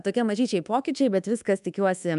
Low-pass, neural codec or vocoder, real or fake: 10.8 kHz; codec, 24 kHz, 1.2 kbps, DualCodec; fake